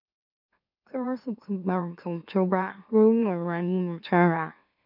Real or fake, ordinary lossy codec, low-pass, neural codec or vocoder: fake; none; 5.4 kHz; autoencoder, 44.1 kHz, a latent of 192 numbers a frame, MeloTTS